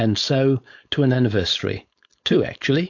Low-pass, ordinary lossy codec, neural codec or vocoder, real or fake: 7.2 kHz; AAC, 48 kbps; codec, 16 kHz, 4.8 kbps, FACodec; fake